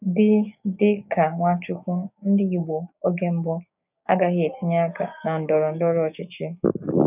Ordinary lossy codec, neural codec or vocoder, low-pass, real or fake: none; codec, 16 kHz, 6 kbps, DAC; 3.6 kHz; fake